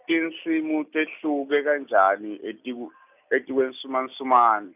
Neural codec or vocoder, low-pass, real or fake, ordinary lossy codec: none; 3.6 kHz; real; none